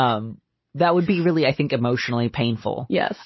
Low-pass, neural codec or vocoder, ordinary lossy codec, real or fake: 7.2 kHz; none; MP3, 24 kbps; real